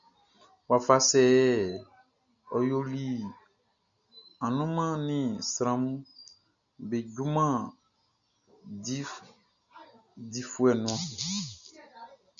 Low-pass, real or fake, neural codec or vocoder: 7.2 kHz; real; none